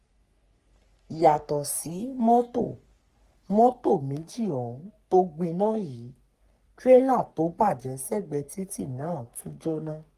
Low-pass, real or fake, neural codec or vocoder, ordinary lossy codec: 14.4 kHz; fake; codec, 44.1 kHz, 3.4 kbps, Pupu-Codec; Opus, 32 kbps